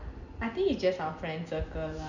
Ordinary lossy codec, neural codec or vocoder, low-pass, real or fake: none; none; 7.2 kHz; real